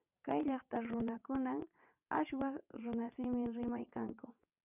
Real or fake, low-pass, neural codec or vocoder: fake; 3.6 kHz; vocoder, 22.05 kHz, 80 mel bands, WaveNeXt